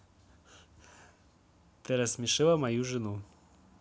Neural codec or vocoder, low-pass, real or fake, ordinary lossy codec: none; none; real; none